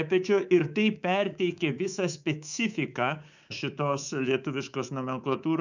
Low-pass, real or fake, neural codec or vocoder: 7.2 kHz; fake; codec, 24 kHz, 3.1 kbps, DualCodec